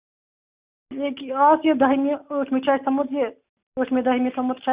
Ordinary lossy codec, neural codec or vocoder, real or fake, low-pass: Opus, 64 kbps; none; real; 3.6 kHz